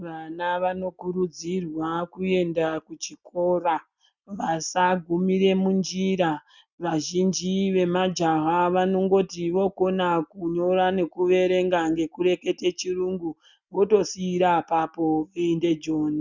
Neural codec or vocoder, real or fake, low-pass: none; real; 7.2 kHz